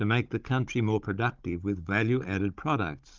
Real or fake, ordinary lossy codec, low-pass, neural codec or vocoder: fake; Opus, 24 kbps; 7.2 kHz; codec, 16 kHz, 16 kbps, FunCodec, trained on Chinese and English, 50 frames a second